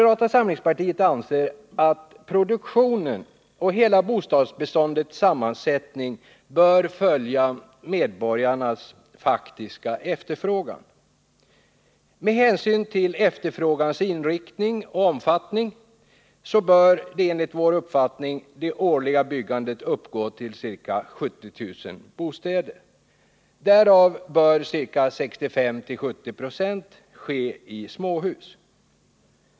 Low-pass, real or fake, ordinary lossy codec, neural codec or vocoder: none; real; none; none